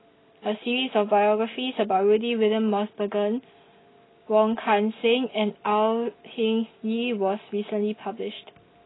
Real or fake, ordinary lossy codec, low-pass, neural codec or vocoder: real; AAC, 16 kbps; 7.2 kHz; none